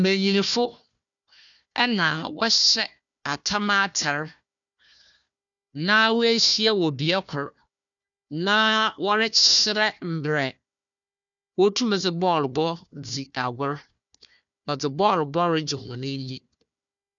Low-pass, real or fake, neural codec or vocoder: 7.2 kHz; fake; codec, 16 kHz, 1 kbps, FunCodec, trained on Chinese and English, 50 frames a second